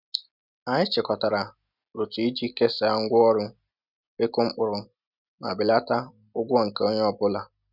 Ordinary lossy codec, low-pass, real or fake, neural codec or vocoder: none; 5.4 kHz; real; none